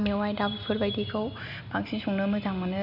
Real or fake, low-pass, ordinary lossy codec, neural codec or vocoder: real; 5.4 kHz; none; none